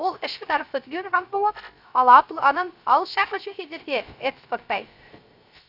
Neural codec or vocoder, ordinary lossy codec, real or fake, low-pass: codec, 16 kHz, 0.3 kbps, FocalCodec; none; fake; 5.4 kHz